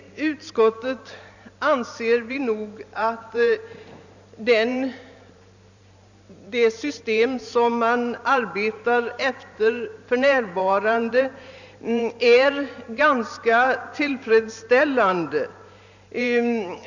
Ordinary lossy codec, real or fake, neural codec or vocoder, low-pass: none; fake; vocoder, 44.1 kHz, 128 mel bands every 512 samples, BigVGAN v2; 7.2 kHz